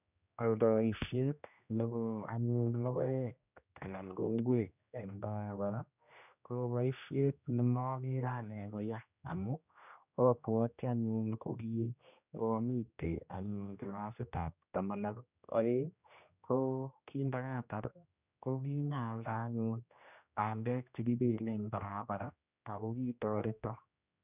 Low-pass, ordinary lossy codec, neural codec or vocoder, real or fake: 3.6 kHz; none; codec, 16 kHz, 1 kbps, X-Codec, HuBERT features, trained on general audio; fake